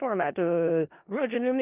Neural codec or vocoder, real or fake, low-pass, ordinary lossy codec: codec, 16 kHz in and 24 kHz out, 0.4 kbps, LongCat-Audio-Codec, four codebook decoder; fake; 3.6 kHz; Opus, 24 kbps